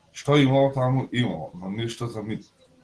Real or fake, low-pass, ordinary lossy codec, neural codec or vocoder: real; 10.8 kHz; Opus, 16 kbps; none